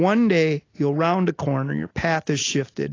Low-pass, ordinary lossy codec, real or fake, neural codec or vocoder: 7.2 kHz; AAC, 32 kbps; real; none